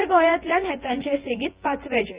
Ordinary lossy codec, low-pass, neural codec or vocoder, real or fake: Opus, 32 kbps; 3.6 kHz; vocoder, 24 kHz, 100 mel bands, Vocos; fake